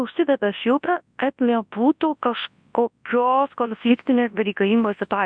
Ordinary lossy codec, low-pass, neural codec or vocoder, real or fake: MP3, 64 kbps; 9.9 kHz; codec, 24 kHz, 0.9 kbps, WavTokenizer, large speech release; fake